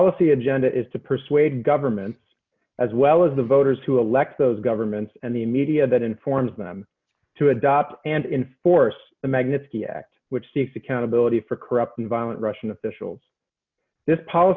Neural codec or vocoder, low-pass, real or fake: none; 7.2 kHz; real